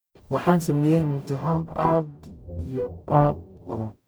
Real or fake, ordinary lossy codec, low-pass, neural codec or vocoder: fake; none; none; codec, 44.1 kHz, 0.9 kbps, DAC